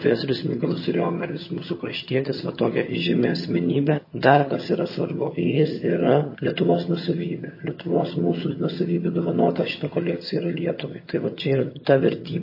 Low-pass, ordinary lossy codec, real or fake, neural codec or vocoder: 5.4 kHz; MP3, 24 kbps; fake; vocoder, 22.05 kHz, 80 mel bands, HiFi-GAN